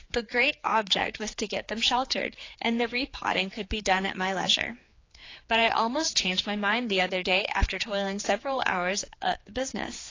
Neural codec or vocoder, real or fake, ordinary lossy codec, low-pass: codec, 16 kHz, 4 kbps, X-Codec, HuBERT features, trained on general audio; fake; AAC, 32 kbps; 7.2 kHz